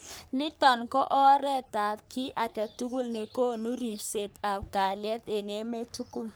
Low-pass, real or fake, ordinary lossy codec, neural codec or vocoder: none; fake; none; codec, 44.1 kHz, 3.4 kbps, Pupu-Codec